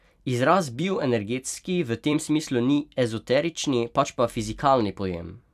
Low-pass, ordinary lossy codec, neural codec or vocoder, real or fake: 14.4 kHz; none; vocoder, 44.1 kHz, 128 mel bands every 256 samples, BigVGAN v2; fake